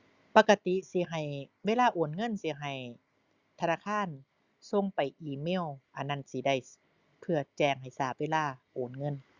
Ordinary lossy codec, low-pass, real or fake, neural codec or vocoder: Opus, 64 kbps; 7.2 kHz; real; none